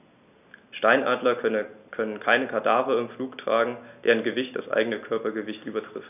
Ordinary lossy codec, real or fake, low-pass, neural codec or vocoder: none; real; 3.6 kHz; none